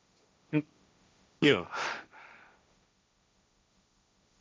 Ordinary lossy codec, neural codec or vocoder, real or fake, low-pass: none; codec, 16 kHz, 1.1 kbps, Voila-Tokenizer; fake; none